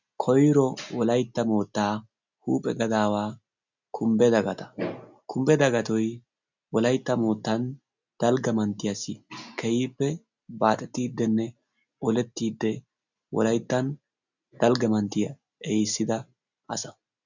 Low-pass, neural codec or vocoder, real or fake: 7.2 kHz; none; real